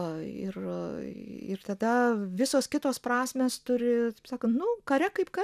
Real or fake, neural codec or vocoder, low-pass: real; none; 14.4 kHz